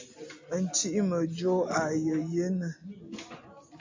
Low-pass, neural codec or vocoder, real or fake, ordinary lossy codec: 7.2 kHz; none; real; AAC, 48 kbps